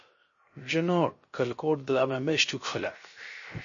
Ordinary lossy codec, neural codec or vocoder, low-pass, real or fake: MP3, 32 kbps; codec, 16 kHz, 0.3 kbps, FocalCodec; 7.2 kHz; fake